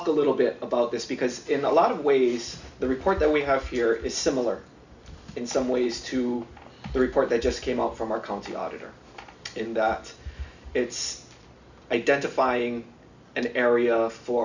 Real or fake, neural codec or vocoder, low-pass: real; none; 7.2 kHz